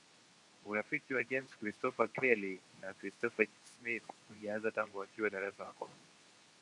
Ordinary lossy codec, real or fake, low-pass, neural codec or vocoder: MP3, 96 kbps; fake; 10.8 kHz; codec, 24 kHz, 0.9 kbps, WavTokenizer, medium speech release version 2